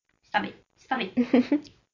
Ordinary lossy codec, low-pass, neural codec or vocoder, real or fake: AAC, 48 kbps; 7.2 kHz; none; real